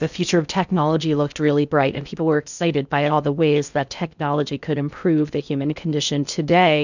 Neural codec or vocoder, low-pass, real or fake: codec, 16 kHz in and 24 kHz out, 0.6 kbps, FocalCodec, streaming, 4096 codes; 7.2 kHz; fake